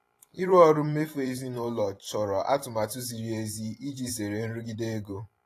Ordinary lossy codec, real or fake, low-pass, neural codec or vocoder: AAC, 48 kbps; fake; 14.4 kHz; vocoder, 44.1 kHz, 128 mel bands every 512 samples, BigVGAN v2